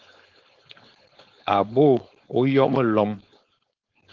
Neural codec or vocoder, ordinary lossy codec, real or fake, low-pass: codec, 16 kHz, 4.8 kbps, FACodec; Opus, 16 kbps; fake; 7.2 kHz